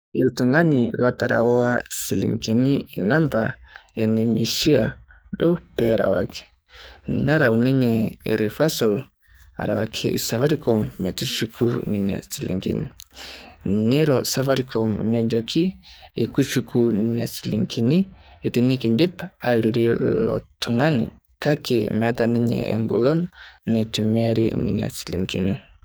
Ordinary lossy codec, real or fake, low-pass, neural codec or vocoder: none; fake; none; codec, 44.1 kHz, 2.6 kbps, SNAC